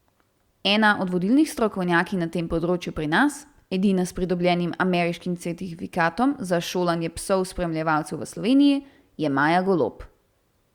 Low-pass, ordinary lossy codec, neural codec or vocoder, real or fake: 19.8 kHz; Opus, 64 kbps; none; real